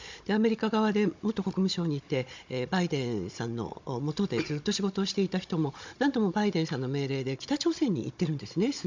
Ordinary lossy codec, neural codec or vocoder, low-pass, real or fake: MP3, 64 kbps; codec, 16 kHz, 16 kbps, FunCodec, trained on Chinese and English, 50 frames a second; 7.2 kHz; fake